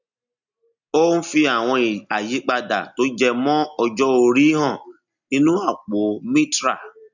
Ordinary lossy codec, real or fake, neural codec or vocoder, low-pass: none; real; none; 7.2 kHz